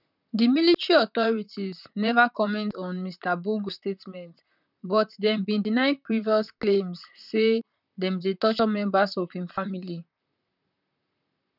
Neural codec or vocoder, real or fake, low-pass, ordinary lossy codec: vocoder, 44.1 kHz, 128 mel bands, Pupu-Vocoder; fake; 5.4 kHz; none